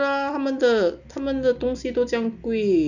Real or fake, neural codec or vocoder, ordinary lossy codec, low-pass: real; none; none; 7.2 kHz